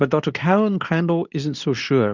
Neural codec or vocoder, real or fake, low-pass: codec, 24 kHz, 0.9 kbps, WavTokenizer, medium speech release version 2; fake; 7.2 kHz